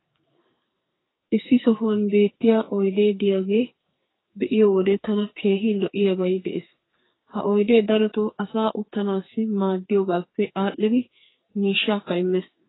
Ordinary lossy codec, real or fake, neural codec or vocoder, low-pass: AAC, 16 kbps; fake; codec, 44.1 kHz, 2.6 kbps, SNAC; 7.2 kHz